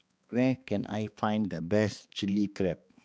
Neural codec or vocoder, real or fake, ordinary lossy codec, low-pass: codec, 16 kHz, 2 kbps, X-Codec, HuBERT features, trained on balanced general audio; fake; none; none